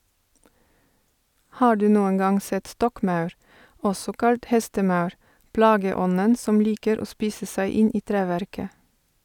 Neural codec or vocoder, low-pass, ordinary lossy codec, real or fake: none; 19.8 kHz; none; real